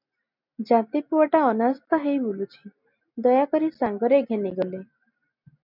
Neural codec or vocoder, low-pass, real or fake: none; 5.4 kHz; real